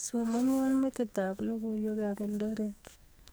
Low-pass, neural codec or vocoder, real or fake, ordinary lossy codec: none; codec, 44.1 kHz, 2.6 kbps, SNAC; fake; none